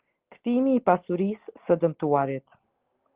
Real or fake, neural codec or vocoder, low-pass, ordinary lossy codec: real; none; 3.6 kHz; Opus, 16 kbps